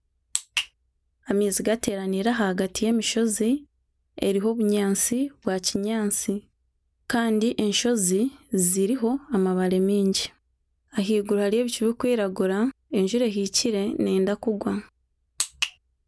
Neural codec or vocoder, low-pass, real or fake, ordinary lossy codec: none; none; real; none